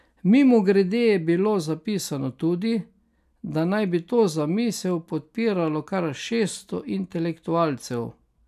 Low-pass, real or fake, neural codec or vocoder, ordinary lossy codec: 14.4 kHz; real; none; none